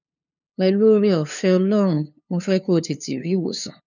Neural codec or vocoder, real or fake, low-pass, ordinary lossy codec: codec, 16 kHz, 2 kbps, FunCodec, trained on LibriTTS, 25 frames a second; fake; 7.2 kHz; none